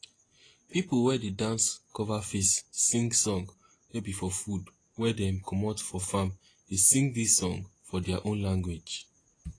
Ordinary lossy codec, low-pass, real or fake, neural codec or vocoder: AAC, 32 kbps; 9.9 kHz; real; none